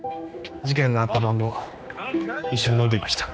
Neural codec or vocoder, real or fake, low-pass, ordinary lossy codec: codec, 16 kHz, 2 kbps, X-Codec, HuBERT features, trained on general audio; fake; none; none